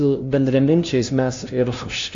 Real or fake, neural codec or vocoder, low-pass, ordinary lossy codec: fake; codec, 16 kHz, 0.5 kbps, FunCodec, trained on LibriTTS, 25 frames a second; 7.2 kHz; AAC, 48 kbps